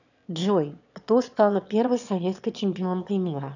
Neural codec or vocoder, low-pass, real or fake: autoencoder, 22.05 kHz, a latent of 192 numbers a frame, VITS, trained on one speaker; 7.2 kHz; fake